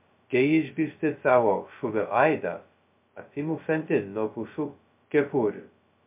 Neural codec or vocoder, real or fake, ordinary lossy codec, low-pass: codec, 16 kHz, 0.2 kbps, FocalCodec; fake; MP3, 32 kbps; 3.6 kHz